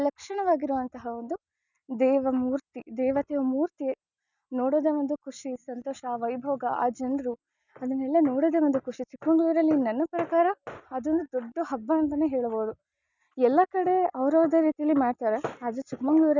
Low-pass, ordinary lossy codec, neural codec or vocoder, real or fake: 7.2 kHz; none; none; real